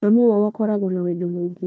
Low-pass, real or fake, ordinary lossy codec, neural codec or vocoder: none; fake; none; codec, 16 kHz, 1 kbps, FunCodec, trained on Chinese and English, 50 frames a second